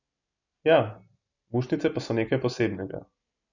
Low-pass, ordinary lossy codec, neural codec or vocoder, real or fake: 7.2 kHz; none; none; real